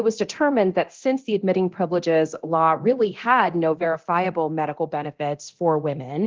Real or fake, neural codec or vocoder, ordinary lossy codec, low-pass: fake; codec, 24 kHz, 0.9 kbps, DualCodec; Opus, 16 kbps; 7.2 kHz